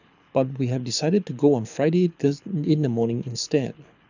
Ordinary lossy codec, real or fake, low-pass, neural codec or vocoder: none; fake; 7.2 kHz; codec, 24 kHz, 6 kbps, HILCodec